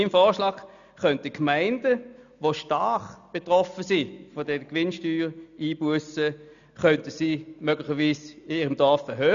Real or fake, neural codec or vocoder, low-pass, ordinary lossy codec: real; none; 7.2 kHz; none